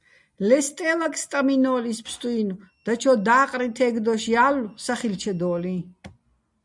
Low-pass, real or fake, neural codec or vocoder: 10.8 kHz; real; none